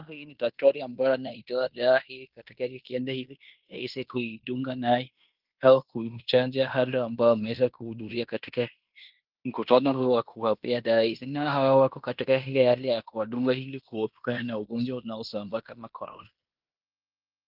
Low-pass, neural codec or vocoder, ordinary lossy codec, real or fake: 5.4 kHz; codec, 16 kHz in and 24 kHz out, 0.9 kbps, LongCat-Audio-Codec, fine tuned four codebook decoder; Opus, 32 kbps; fake